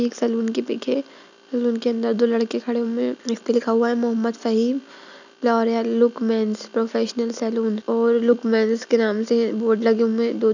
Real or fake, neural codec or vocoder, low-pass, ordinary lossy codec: real; none; 7.2 kHz; none